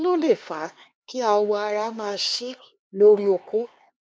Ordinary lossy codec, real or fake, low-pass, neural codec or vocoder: none; fake; none; codec, 16 kHz, 2 kbps, X-Codec, HuBERT features, trained on LibriSpeech